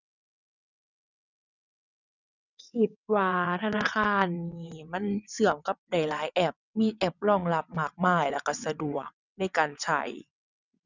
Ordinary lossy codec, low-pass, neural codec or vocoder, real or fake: none; 7.2 kHz; vocoder, 22.05 kHz, 80 mel bands, WaveNeXt; fake